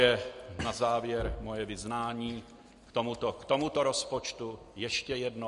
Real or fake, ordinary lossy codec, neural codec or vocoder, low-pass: real; MP3, 48 kbps; none; 14.4 kHz